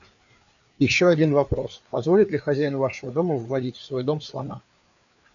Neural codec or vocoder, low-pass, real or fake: codec, 16 kHz, 4 kbps, FreqCodec, larger model; 7.2 kHz; fake